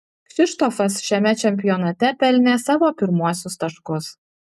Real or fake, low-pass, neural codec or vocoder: real; 14.4 kHz; none